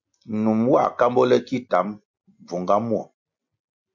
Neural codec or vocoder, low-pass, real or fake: none; 7.2 kHz; real